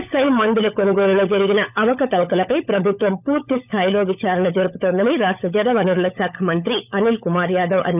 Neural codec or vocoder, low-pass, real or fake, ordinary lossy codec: codec, 16 kHz, 16 kbps, FunCodec, trained on LibriTTS, 50 frames a second; 3.6 kHz; fake; none